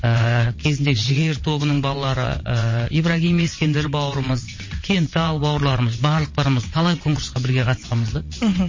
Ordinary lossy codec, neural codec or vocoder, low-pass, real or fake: MP3, 32 kbps; vocoder, 22.05 kHz, 80 mel bands, WaveNeXt; 7.2 kHz; fake